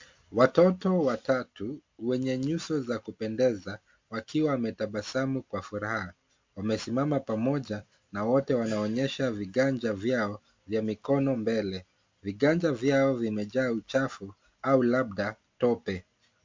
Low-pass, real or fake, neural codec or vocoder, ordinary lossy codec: 7.2 kHz; real; none; MP3, 48 kbps